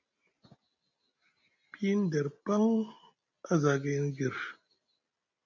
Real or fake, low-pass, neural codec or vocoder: real; 7.2 kHz; none